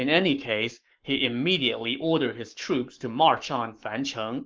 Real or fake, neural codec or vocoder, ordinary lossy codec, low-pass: fake; codec, 44.1 kHz, 7.8 kbps, Pupu-Codec; Opus, 32 kbps; 7.2 kHz